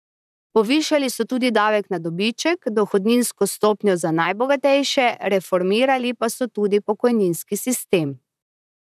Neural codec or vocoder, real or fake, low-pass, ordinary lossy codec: vocoder, 44.1 kHz, 128 mel bands, Pupu-Vocoder; fake; 14.4 kHz; none